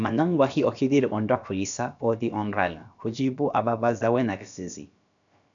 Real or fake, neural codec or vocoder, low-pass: fake; codec, 16 kHz, about 1 kbps, DyCAST, with the encoder's durations; 7.2 kHz